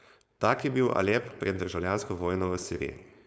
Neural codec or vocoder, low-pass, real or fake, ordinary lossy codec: codec, 16 kHz, 4.8 kbps, FACodec; none; fake; none